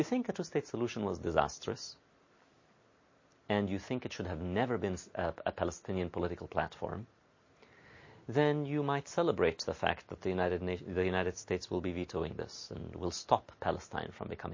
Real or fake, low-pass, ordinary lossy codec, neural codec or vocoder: real; 7.2 kHz; MP3, 32 kbps; none